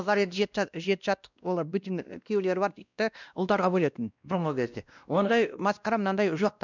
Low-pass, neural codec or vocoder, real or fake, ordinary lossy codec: 7.2 kHz; codec, 16 kHz, 1 kbps, X-Codec, WavLM features, trained on Multilingual LibriSpeech; fake; none